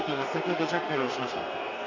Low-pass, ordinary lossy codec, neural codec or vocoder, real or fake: 7.2 kHz; none; codec, 32 kHz, 1.9 kbps, SNAC; fake